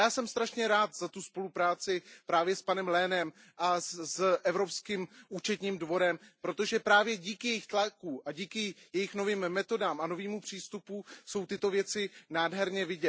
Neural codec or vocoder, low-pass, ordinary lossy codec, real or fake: none; none; none; real